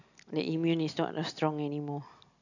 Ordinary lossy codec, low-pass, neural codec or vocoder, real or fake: none; 7.2 kHz; none; real